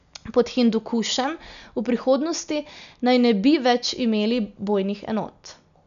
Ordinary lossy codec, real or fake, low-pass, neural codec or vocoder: none; real; 7.2 kHz; none